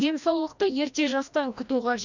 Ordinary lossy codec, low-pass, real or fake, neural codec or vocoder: none; 7.2 kHz; fake; codec, 16 kHz, 1 kbps, FreqCodec, larger model